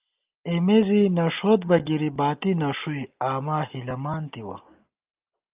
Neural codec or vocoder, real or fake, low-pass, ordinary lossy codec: none; real; 3.6 kHz; Opus, 32 kbps